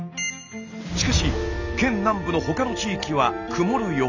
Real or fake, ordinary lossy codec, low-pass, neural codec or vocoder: real; none; 7.2 kHz; none